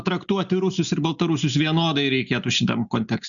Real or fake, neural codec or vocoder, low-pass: real; none; 7.2 kHz